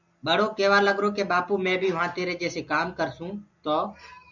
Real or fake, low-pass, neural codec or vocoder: real; 7.2 kHz; none